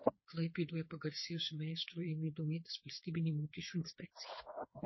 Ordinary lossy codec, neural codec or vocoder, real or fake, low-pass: MP3, 24 kbps; codec, 16 kHz, 4 kbps, FreqCodec, smaller model; fake; 7.2 kHz